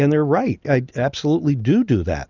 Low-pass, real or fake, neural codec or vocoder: 7.2 kHz; real; none